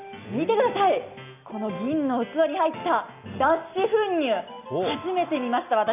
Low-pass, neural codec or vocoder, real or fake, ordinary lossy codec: 3.6 kHz; none; real; none